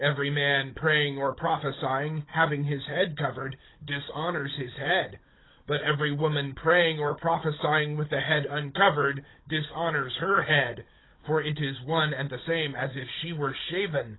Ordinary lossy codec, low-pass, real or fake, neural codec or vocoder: AAC, 16 kbps; 7.2 kHz; fake; codec, 16 kHz, 16 kbps, FunCodec, trained on Chinese and English, 50 frames a second